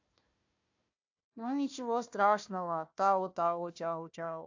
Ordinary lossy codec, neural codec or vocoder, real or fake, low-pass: AAC, 48 kbps; codec, 16 kHz, 1 kbps, FunCodec, trained on Chinese and English, 50 frames a second; fake; 7.2 kHz